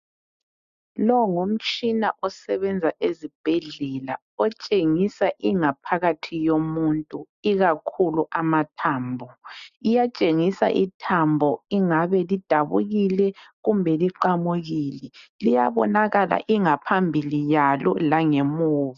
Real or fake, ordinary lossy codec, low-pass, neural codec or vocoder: real; MP3, 64 kbps; 7.2 kHz; none